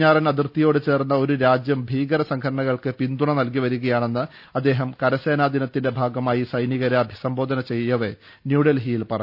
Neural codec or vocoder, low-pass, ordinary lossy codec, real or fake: none; 5.4 kHz; none; real